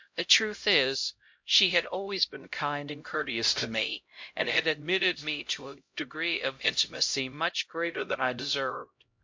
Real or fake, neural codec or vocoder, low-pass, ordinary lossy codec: fake; codec, 16 kHz, 0.5 kbps, X-Codec, HuBERT features, trained on LibriSpeech; 7.2 kHz; MP3, 48 kbps